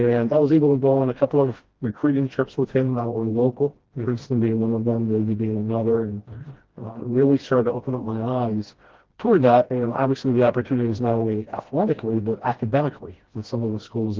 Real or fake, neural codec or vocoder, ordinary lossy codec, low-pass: fake; codec, 16 kHz, 1 kbps, FreqCodec, smaller model; Opus, 16 kbps; 7.2 kHz